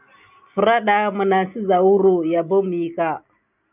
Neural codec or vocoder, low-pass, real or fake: none; 3.6 kHz; real